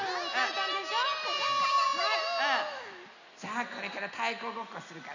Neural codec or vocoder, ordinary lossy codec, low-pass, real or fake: none; none; 7.2 kHz; real